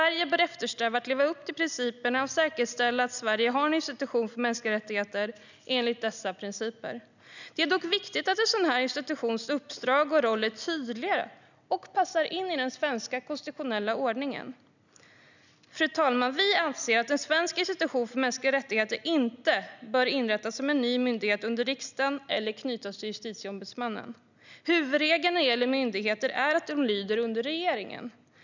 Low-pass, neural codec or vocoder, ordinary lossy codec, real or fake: 7.2 kHz; none; none; real